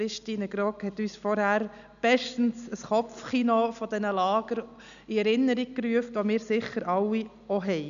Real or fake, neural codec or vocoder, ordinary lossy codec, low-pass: real; none; none; 7.2 kHz